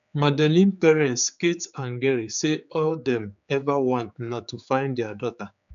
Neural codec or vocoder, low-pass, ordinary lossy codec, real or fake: codec, 16 kHz, 4 kbps, X-Codec, HuBERT features, trained on general audio; 7.2 kHz; MP3, 96 kbps; fake